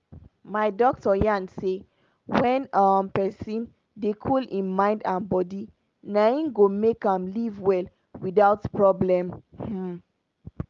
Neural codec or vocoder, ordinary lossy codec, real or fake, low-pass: none; Opus, 32 kbps; real; 7.2 kHz